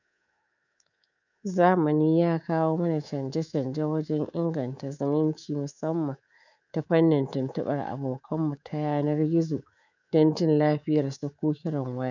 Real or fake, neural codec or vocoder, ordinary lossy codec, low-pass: fake; codec, 24 kHz, 3.1 kbps, DualCodec; none; 7.2 kHz